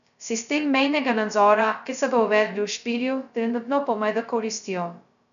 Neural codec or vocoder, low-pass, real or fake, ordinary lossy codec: codec, 16 kHz, 0.2 kbps, FocalCodec; 7.2 kHz; fake; none